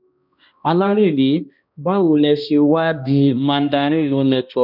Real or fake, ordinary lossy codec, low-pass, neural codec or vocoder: fake; none; 5.4 kHz; codec, 16 kHz, 1 kbps, X-Codec, HuBERT features, trained on balanced general audio